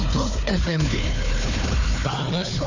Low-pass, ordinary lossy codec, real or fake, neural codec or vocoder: 7.2 kHz; none; fake; codec, 16 kHz, 4 kbps, FunCodec, trained on Chinese and English, 50 frames a second